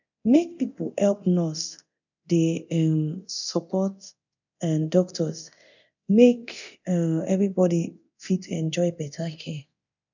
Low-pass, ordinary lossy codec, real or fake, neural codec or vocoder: 7.2 kHz; none; fake; codec, 24 kHz, 0.9 kbps, DualCodec